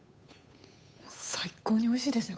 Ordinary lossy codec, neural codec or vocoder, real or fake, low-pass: none; codec, 16 kHz, 8 kbps, FunCodec, trained on Chinese and English, 25 frames a second; fake; none